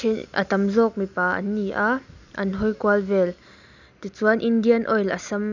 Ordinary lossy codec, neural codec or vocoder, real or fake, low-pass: none; none; real; 7.2 kHz